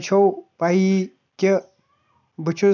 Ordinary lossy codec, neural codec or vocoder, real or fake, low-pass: none; none; real; 7.2 kHz